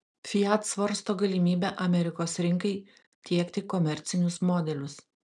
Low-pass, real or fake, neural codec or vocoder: 10.8 kHz; real; none